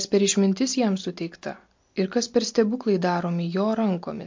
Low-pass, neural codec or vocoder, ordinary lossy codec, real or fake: 7.2 kHz; none; MP3, 48 kbps; real